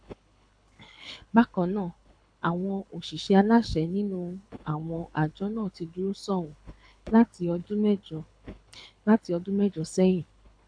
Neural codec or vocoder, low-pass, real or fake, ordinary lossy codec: codec, 24 kHz, 6 kbps, HILCodec; 9.9 kHz; fake; AAC, 64 kbps